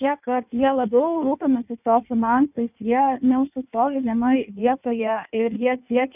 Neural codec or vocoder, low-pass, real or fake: codec, 16 kHz in and 24 kHz out, 1.1 kbps, FireRedTTS-2 codec; 3.6 kHz; fake